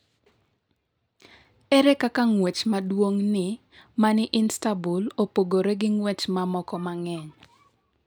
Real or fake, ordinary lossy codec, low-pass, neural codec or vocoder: real; none; none; none